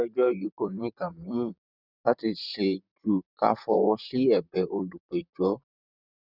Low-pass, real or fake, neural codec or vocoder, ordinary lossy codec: 5.4 kHz; fake; vocoder, 44.1 kHz, 128 mel bands, Pupu-Vocoder; none